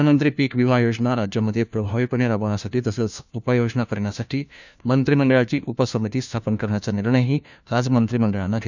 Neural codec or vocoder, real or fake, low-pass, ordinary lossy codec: codec, 16 kHz, 1 kbps, FunCodec, trained on LibriTTS, 50 frames a second; fake; 7.2 kHz; none